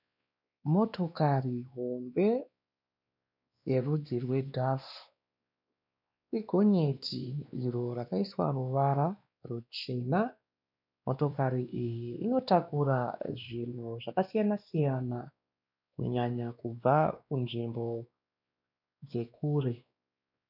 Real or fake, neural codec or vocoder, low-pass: fake; codec, 16 kHz, 2 kbps, X-Codec, WavLM features, trained on Multilingual LibriSpeech; 5.4 kHz